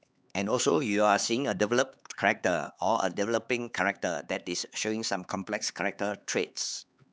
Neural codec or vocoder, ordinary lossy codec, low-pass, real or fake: codec, 16 kHz, 4 kbps, X-Codec, HuBERT features, trained on LibriSpeech; none; none; fake